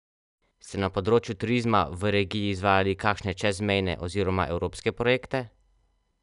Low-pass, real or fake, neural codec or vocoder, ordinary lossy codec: 10.8 kHz; real; none; none